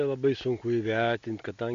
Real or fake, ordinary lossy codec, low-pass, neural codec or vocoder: real; MP3, 48 kbps; 7.2 kHz; none